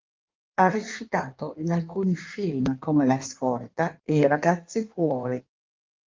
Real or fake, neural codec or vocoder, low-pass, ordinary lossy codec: fake; codec, 16 kHz in and 24 kHz out, 1.1 kbps, FireRedTTS-2 codec; 7.2 kHz; Opus, 32 kbps